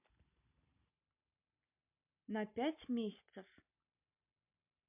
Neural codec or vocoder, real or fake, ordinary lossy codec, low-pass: none; real; MP3, 32 kbps; 3.6 kHz